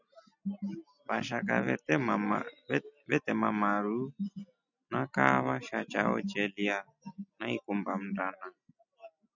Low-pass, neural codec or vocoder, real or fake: 7.2 kHz; none; real